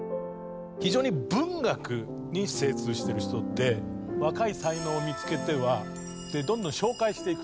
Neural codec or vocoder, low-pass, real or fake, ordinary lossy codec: none; none; real; none